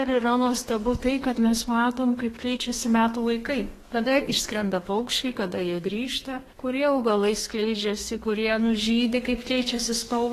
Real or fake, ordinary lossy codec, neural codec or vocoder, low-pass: fake; AAC, 48 kbps; codec, 32 kHz, 1.9 kbps, SNAC; 14.4 kHz